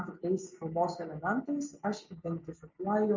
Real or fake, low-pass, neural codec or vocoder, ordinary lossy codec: real; 7.2 kHz; none; MP3, 64 kbps